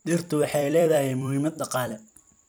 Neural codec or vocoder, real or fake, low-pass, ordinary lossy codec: vocoder, 44.1 kHz, 128 mel bands every 256 samples, BigVGAN v2; fake; none; none